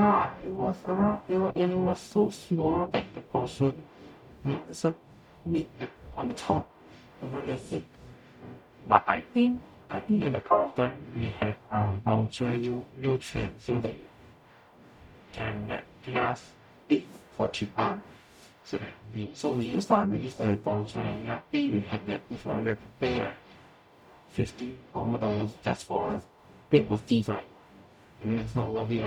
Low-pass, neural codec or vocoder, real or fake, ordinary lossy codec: 19.8 kHz; codec, 44.1 kHz, 0.9 kbps, DAC; fake; none